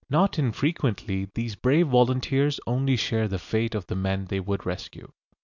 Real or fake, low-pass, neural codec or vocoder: real; 7.2 kHz; none